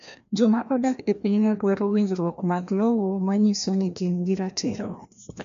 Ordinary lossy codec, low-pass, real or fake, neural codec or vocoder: MP3, 64 kbps; 7.2 kHz; fake; codec, 16 kHz, 1 kbps, FreqCodec, larger model